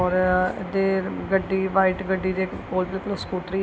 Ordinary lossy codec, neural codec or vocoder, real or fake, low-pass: none; none; real; none